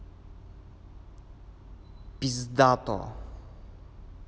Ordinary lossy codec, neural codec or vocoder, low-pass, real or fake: none; none; none; real